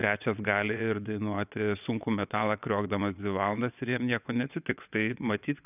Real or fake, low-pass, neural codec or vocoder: fake; 3.6 kHz; vocoder, 22.05 kHz, 80 mel bands, WaveNeXt